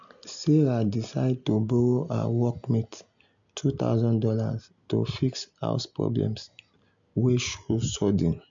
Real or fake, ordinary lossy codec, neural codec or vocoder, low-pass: real; AAC, 64 kbps; none; 7.2 kHz